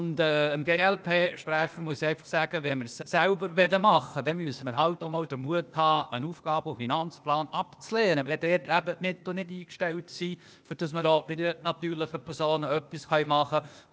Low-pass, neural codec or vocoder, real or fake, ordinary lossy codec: none; codec, 16 kHz, 0.8 kbps, ZipCodec; fake; none